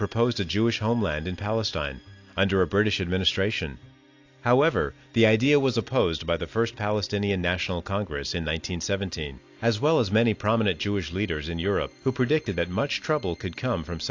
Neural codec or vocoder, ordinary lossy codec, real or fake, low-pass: none; AAC, 48 kbps; real; 7.2 kHz